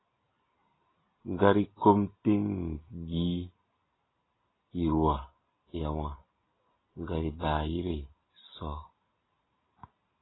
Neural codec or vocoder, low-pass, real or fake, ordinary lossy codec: none; 7.2 kHz; real; AAC, 16 kbps